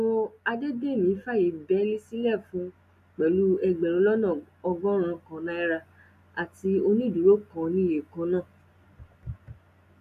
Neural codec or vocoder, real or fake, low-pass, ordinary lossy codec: none; real; 14.4 kHz; none